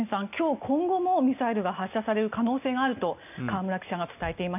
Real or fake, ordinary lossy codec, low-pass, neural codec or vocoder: real; none; 3.6 kHz; none